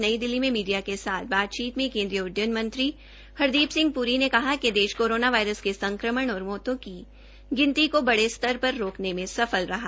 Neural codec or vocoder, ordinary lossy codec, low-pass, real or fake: none; none; none; real